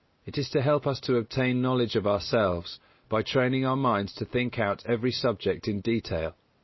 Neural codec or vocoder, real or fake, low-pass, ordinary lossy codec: none; real; 7.2 kHz; MP3, 24 kbps